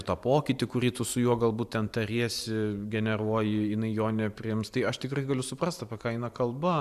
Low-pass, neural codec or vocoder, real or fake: 14.4 kHz; vocoder, 44.1 kHz, 128 mel bands every 512 samples, BigVGAN v2; fake